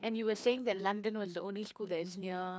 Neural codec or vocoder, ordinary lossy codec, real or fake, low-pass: codec, 16 kHz, 2 kbps, FreqCodec, larger model; none; fake; none